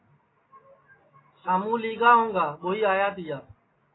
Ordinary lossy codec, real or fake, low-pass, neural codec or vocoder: AAC, 16 kbps; real; 7.2 kHz; none